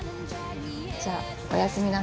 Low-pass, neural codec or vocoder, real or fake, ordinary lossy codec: none; none; real; none